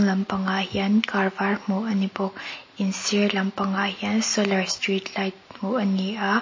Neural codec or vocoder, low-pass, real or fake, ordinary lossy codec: none; 7.2 kHz; real; MP3, 32 kbps